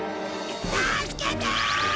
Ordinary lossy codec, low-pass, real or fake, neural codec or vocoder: none; none; real; none